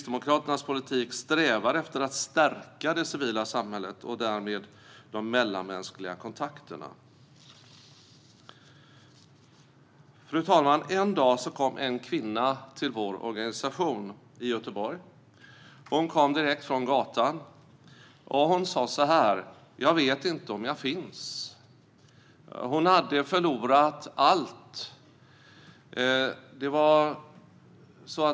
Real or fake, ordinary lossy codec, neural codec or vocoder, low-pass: real; none; none; none